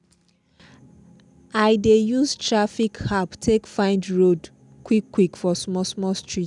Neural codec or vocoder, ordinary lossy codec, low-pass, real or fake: none; none; 10.8 kHz; real